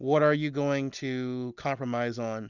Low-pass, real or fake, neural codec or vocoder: 7.2 kHz; real; none